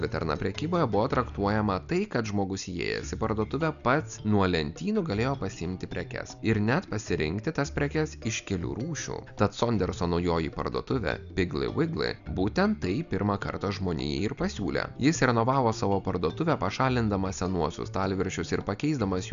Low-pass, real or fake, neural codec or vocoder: 7.2 kHz; real; none